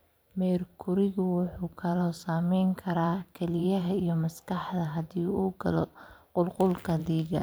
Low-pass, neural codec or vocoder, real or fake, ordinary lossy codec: none; vocoder, 44.1 kHz, 128 mel bands every 512 samples, BigVGAN v2; fake; none